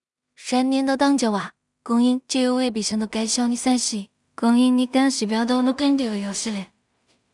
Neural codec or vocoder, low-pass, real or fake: codec, 16 kHz in and 24 kHz out, 0.4 kbps, LongCat-Audio-Codec, two codebook decoder; 10.8 kHz; fake